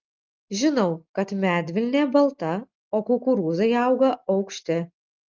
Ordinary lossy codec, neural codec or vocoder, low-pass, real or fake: Opus, 32 kbps; none; 7.2 kHz; real